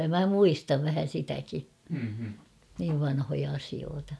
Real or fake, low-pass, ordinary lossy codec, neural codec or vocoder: real; none; none; none